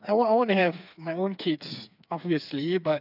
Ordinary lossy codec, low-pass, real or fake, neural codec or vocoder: none; 5.4 kHz; fake; codec, 16 kHz, 4 kbps, FreqCodec, smaller model